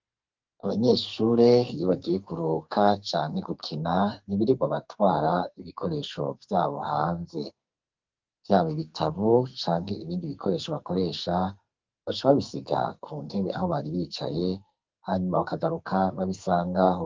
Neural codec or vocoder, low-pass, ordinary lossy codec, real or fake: codec, 44.1 kHz, 2.6 kbps, SNAC; 7.2 kHz; Opus, 24 kbps; fake